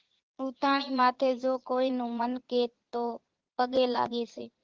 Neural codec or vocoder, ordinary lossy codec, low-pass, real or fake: vocoder, 22.05 kHz, 80 mel bands, Vocos; Opus, 16 kbps; 7.2 kHz; fake